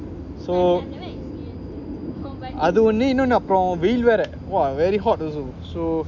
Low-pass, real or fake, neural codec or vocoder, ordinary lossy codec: 7.2 kHz; real; none; none